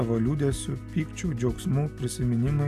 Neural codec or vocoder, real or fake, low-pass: none; real; 14.4 kHz